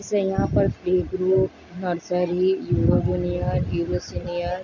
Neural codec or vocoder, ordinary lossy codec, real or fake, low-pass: none; none; real; 7.2 kHz